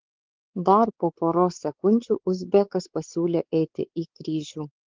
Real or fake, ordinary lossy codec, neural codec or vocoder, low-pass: fake; Opus, 16 kbps; codec, 16 kHz, 4 kbps, X-Codec, WavLM features, trained on Multilingual LibriSpeech; 7.2 kHz